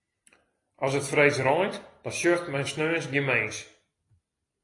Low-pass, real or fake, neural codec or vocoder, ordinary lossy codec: 10.8 kHz; real; none; AAC, 32 kbps